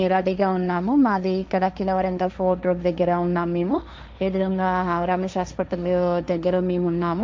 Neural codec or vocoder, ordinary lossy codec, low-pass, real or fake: codec, 16 kHz, 1.1 kbps, Voila-Tokenizer; none; none; fake